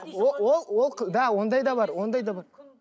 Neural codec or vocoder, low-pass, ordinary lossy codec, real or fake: none; none; none; real